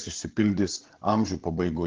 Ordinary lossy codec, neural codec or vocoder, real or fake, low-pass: Opus, 16 kbps; codec, 16 kHz, 8 kbps, FreqCodec, larger model; fake; 7.2 kHz